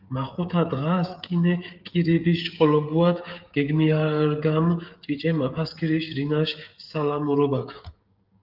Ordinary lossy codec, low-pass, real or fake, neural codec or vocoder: Opus, 32 kbps; 5.4 kHz; fake; codec, 16 kHz, 16 kbps, FreqCodec, smaller model